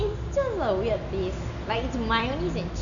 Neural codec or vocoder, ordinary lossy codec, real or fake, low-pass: none; none; real; 7.2 kHz